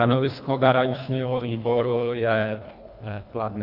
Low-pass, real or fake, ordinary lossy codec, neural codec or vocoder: 5.4 kHz; fake; Opus, 64 kbps; codec, 24 kHz, 1.5 kbps, HILCodec